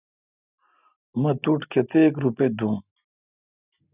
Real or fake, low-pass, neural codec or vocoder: real; 3.6 kHz; none